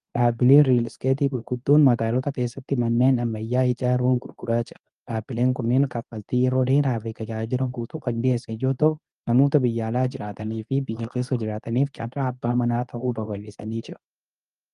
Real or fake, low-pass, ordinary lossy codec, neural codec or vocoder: fake; 10.8 kHz; Opus, 32 kbps; codec, 24 kHz, 0.9 kbps, WavTokenizer, medium speech release version 2